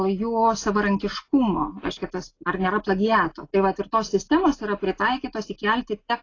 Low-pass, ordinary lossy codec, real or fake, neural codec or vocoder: 7.2 kHz; AAC, 32 kbps; real; none